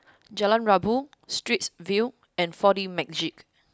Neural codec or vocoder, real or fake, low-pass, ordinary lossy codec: none; real; none; none